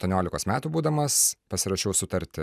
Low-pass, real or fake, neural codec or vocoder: 14.4 kHz; real; none